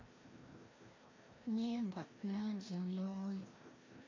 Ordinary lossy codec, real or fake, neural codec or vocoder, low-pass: AAC, 32 kbps; fake; codec, 16 kHz, 1 kbps, FreqCodec, larger model; 7.2 kHz